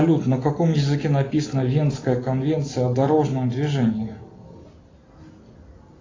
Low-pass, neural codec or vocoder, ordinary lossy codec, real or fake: 7.2 kHz; none; AAC, 32 kbps; real